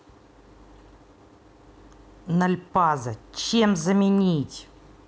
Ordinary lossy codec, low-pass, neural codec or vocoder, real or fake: none; none; none; real